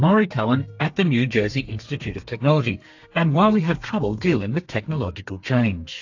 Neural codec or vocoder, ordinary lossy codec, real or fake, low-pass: codec, 32 kHz, 1.9 kbps, SNAC; AAC, 48 kbps; fake; 7.2 kHz